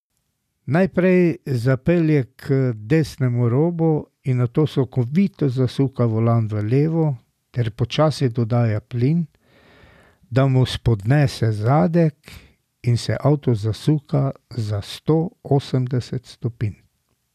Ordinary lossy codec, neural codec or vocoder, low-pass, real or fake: none; none; 14.4 kHz; real